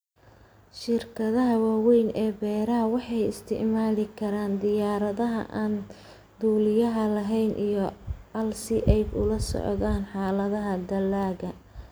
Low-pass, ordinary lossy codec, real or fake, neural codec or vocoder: none; none; real; none